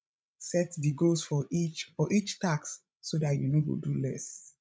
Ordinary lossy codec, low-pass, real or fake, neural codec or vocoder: none; none; fake; codec, 16 kHz, 16 kbps, FreqCodec, larger model